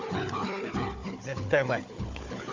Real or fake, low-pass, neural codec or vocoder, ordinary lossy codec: fake; 7.2 kHz; codec, 16 kHz, 4 kbps, FunCodec, trained on Chinese and English, 50 frames a second; MP3, 48 kbps